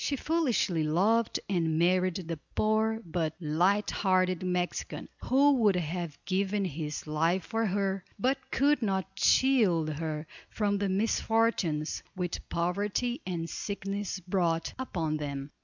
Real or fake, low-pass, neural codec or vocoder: real; 7.2 kHz; none